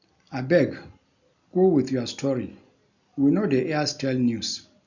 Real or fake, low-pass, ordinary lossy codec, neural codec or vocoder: real; 7.2 kHz; none; none